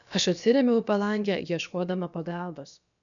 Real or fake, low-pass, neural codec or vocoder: fake; 7.2 kHz; codec, 16 kHz, about 1 kbps, DyCAST, with the encoder's durations